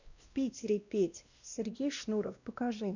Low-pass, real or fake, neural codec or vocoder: 7.2 kHz; fake; codec, 16 kHz, 1 kbps, X-Codec, WavLM features, trained on Multilingual LibriSpeech